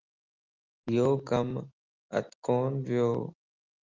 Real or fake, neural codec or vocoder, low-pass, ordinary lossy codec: real; none; 7.2 kHz; Opus, 24 kbps